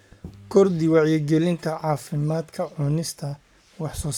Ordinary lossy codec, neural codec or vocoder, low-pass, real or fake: none; codec, 44.1 kHz, 7.8 kbps, Pupu-Codec; 19.8 kHz; fake